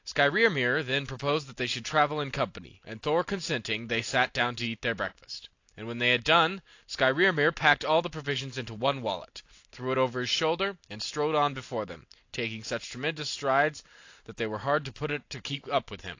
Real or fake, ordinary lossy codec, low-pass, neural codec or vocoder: real; AAC, 48 kbps; 7.2 kHz; none